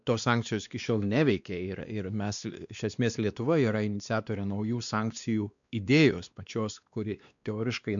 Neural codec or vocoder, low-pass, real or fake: codec, 16 kHz, 2 kbps, X-Codec, WavLM features, trained on Multilingual LibriSpeech; 7.2 kHz; fake